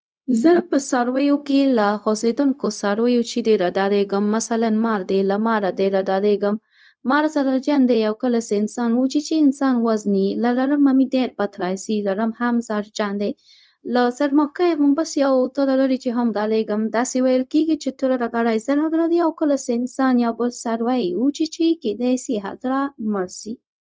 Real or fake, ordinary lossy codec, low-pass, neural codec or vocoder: fake; none; none; codec, 16 kHz, 0.4 kbps, LongCat-Audio-Codec